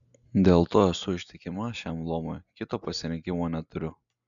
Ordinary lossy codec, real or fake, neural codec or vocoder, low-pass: MP3, 96 kbps; real; none; 7.2 kHz